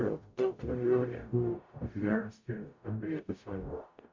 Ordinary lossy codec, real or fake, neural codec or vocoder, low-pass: MP3, 48 kbps; fake; codec, 44.1 kHz, 0.9 kbps, DAC; 7.2 kHz